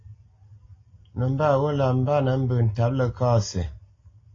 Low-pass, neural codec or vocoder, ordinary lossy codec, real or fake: 7.2 kHz; none; AAC, 32 kbps; real